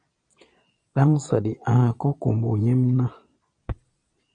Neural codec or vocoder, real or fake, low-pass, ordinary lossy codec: vocoder, 22.05 kHz, 80 mel bands, Vocos; fake; 9.9 kHz; MP3, 64 kbps